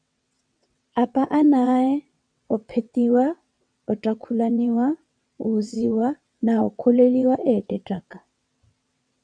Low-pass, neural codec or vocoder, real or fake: 9.9 kHz; vocoder, 22.05 kHz, 80 mel bands, WaveNeXt; fake